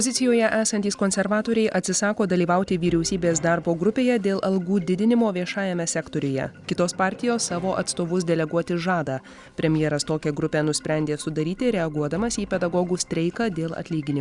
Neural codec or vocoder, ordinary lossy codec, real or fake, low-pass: none; Opus, 64 kbps; real; 10.8 kHz